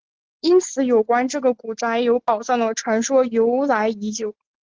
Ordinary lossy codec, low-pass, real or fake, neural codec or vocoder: Opus, 16 kbps; 7.2 kHz; real; none